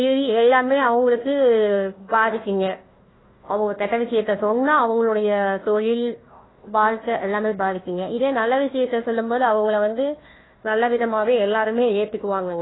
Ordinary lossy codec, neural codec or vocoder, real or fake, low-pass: AAC, 16 kbps; codec, 16 kHz, 1 kbps, FunCodec, trained on Chinese and English, 50 frames a second; fake; 7.2 kHz